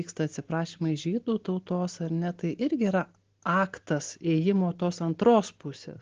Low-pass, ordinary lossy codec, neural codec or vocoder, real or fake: 7.2 kHz; Opus, 16 kbps; none; real